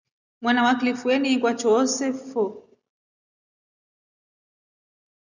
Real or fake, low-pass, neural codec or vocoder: real; 7.2 kHz; none